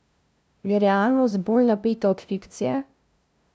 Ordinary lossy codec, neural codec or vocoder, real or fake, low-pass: none; codec, 16 kHz, 0.5 kbps, FunCodec, trained on LibriTTS, 25 frames a second; fake; none